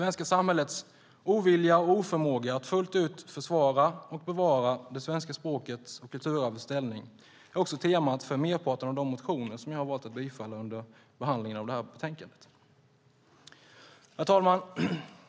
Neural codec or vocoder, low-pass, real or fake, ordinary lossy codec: none; none; real; none